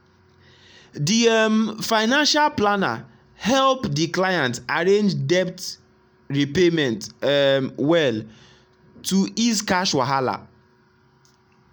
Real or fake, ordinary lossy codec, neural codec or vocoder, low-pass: real; none; none; none